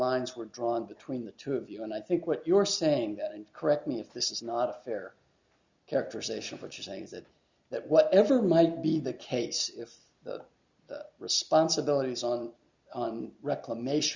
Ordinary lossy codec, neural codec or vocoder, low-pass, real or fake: Opus, 64 kbps; none; 7.2 kHz; real